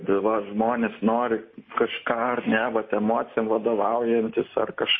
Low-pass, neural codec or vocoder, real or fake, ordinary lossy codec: 7.2 kHz; none; real; MP3, 24 kbps